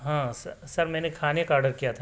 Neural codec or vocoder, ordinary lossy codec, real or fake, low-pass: none; none; real; none